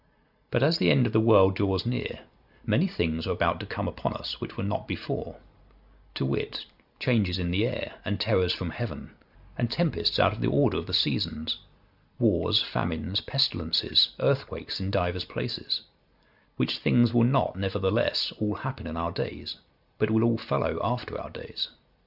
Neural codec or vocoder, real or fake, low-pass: none; real; 5.4 kHz